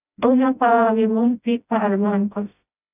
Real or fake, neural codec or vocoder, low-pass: fake; codec, 16 kHz, 0.5 kbps, FreqCodec, smaller model; 3.6 kHz